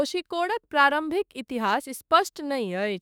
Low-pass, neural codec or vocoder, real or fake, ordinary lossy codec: none; autoencoder, 48 kHz, 32 numbers a frame, DAC-VAE, trained on Japanese speech; fake; none